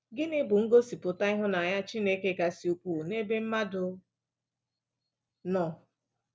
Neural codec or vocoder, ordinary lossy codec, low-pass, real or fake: none; none; none; real